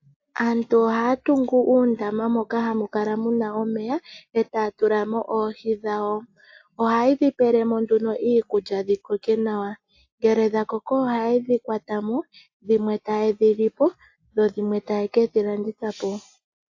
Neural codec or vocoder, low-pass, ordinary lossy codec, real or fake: none; 7.2 kHz; AAC, 48 kbps; real